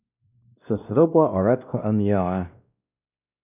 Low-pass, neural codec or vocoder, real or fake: 3.6 kHz; codec, 16 kHz, 1 kbps, X-Codec, WavLM features, trained on Multilingual LibriSpeech; fake